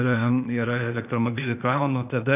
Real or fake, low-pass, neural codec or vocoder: fake; 3.6 kHz; codec, 16 kHz, 0.8 kbps, ZipCodec